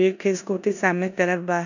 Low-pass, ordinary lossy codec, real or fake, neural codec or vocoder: 7.2 kHz; none; fake; codec, 16 kHz in and 24 kHz out, 0.9 kbps, LongCat-Audio-Codec, four codebook decoder